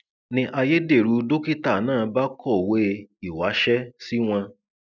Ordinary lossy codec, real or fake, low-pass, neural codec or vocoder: none; real; 7.2 kHz; none